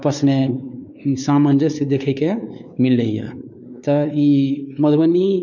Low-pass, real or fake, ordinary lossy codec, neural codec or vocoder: 7.2 kHz; fake; none; codec, 16 kHz, 4 kbps, X-Codec, WavLM features, trained on Multilingual LibriSpeech